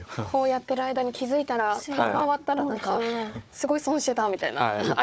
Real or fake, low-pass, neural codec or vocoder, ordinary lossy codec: fake; none; codec, 16 kHz, 4 kbps, FunCodec, trained on Chinese and English, 50 frames a second; none